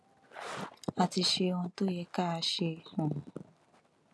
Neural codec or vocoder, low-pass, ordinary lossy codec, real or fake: none; none; none; real